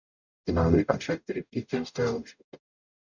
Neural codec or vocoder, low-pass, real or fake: codec, 44.1 kHz, 0.9 kbps, DAC; 7.2 kHz; fake